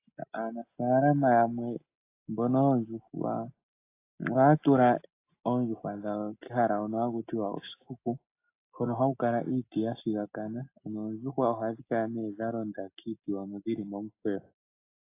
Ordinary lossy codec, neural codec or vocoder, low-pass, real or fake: AAC, 24 kbps; none; 3.6 kHz; real